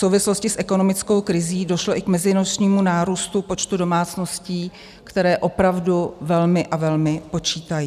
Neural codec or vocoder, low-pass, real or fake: none; 14.4 kHz; real